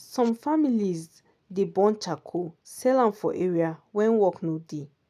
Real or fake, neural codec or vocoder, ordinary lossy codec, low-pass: real; none; none; 14.4 kHz